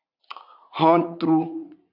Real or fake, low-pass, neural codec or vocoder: fake; 5.4 kHz; vocoder, 44.1 kHz, 80 mel bands, Vocos